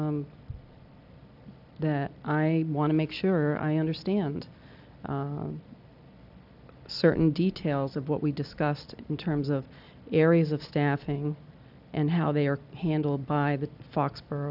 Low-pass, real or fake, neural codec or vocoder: 5.4 kHz; real; none